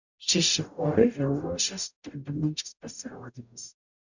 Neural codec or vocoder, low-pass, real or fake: codec, 44.1 kHz, 0.9 kbps, DAC; 7.2 kHz; fake